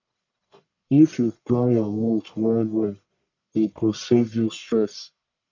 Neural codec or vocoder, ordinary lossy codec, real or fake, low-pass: codec, 44.1 kHz, 1.7 kbps, Pupu-Codec; none; fake; 7.2 kHz